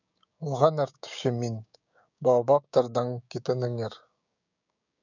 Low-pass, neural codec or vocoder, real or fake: 7.2 kHz; codec, 16 kHz, 6 kbps, DAC; fake